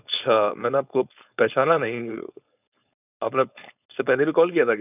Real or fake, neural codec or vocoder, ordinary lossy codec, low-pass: fake; codec, 16 kHz, 4.8 kbps, FACodec; none; 3.6 kHz